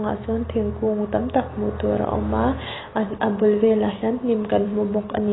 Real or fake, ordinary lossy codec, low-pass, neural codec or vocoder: real; AAC, 16 kbps; 7.2 kHz; none